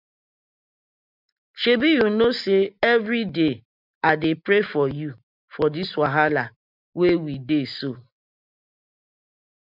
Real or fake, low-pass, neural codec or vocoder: real; 5.4 kHz; none